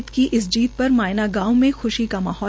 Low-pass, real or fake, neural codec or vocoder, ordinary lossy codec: none; real; none; none